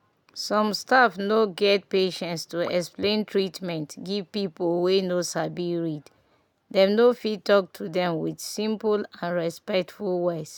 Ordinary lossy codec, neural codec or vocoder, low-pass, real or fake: none; none; 19.8 kHz; real